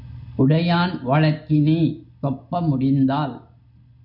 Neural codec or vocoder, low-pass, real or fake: none; 5.4 kHz; real